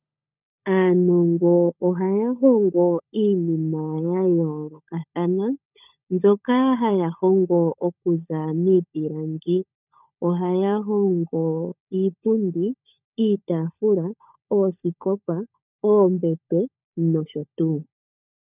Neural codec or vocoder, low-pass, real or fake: codec, 16 kHz, 16 kbps, FunCodec, trained on LibriTTS, 50 frames a second; 3.6 kHz; fake